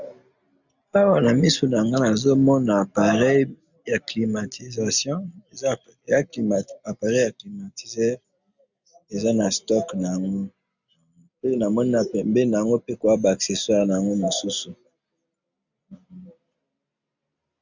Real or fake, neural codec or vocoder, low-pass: real; none; 7.2 kHz